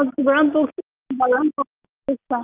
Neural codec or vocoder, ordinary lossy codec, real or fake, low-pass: none; Opus, 24 kbps; real; 3.6 kHz